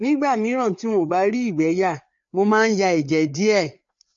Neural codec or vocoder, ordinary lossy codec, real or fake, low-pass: codec, 16 kHz, 4 kbps, FreqCodec, larger model; MP3, 48 kbps; fake; 7.2 kHz